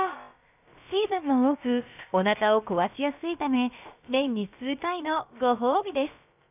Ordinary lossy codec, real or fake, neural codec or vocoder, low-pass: none; fake; codec, 16 kHz, about 1 kbps, DyCAST, with the encoder's durations; 3.6 kHz